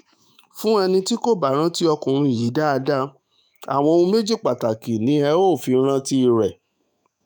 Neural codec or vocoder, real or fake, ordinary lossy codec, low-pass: autoencoder, 48 kHz, 128 numbers a frame, DAC-VAE, trained on Japanese speech; fake; none; none